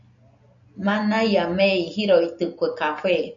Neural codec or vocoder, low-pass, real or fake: none; 7.2 kHz; real